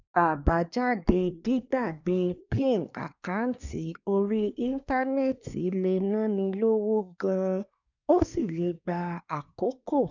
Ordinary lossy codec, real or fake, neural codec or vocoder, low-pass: none; fake; codec, 24 kHz, 1 kbps, SNAC; 7.2 kHz